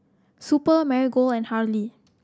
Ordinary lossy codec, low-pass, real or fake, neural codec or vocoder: none; none; real; none